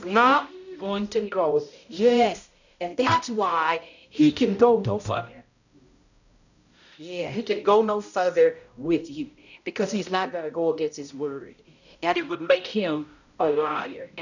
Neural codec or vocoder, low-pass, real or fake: codec, 16 kHz, 0.5 kbps, X-Codec, HuBERT features, trained on balanced general audio; 7.2 kHz; fake